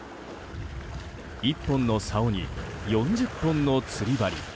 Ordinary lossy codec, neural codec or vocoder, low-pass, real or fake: none; none; none; real